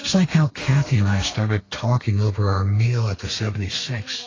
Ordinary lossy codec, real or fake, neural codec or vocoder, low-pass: AAC, 32 kbps; fake; codec, 32 kHz, 1.9 kbps, SNAC; 7.2 kHz